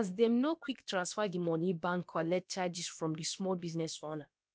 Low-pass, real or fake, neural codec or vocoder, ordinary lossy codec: none; fake; codec, 16 kHz, about 1 kbps, DyCAST, with the encoder's durations; none